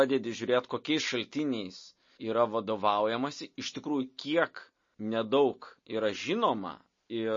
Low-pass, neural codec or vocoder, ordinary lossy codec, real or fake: 7.2 kHz; none; MP3, 32 kbps; real